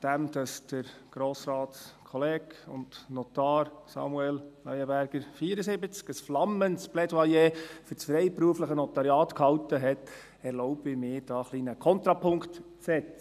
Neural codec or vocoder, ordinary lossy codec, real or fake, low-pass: none; none; real; 14.4 kHz